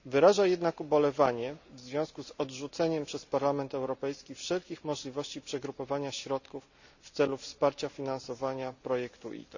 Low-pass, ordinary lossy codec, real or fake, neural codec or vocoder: 7.2 kHz; none; real; none